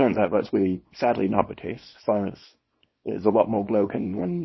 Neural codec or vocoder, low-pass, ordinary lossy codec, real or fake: codec, 24 kHz, 0.9 kbps, WavTokenizer, small release; 7.2 kHz; MP3, 24 kbps; fake